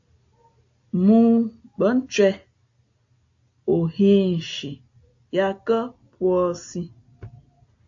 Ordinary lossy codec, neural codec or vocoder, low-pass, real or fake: AAC, 48 kbps; none; 7.2 kHz; real